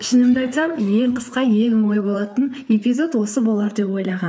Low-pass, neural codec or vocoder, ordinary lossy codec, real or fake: none; codec, 16 kHz, 4 kbps, FreqCodec, larger model; none; fake